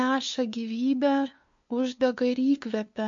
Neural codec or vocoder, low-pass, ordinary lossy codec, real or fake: codec, 16 kHz, 2 kbps, FunCodec, trained on LibriTTS, 25 frames a second; 7.2 kHz; MP3, 48 kbps; fake